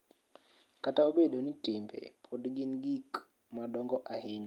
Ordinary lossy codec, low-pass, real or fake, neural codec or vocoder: Opus, 32 kbps; 19.8 kHz; real; none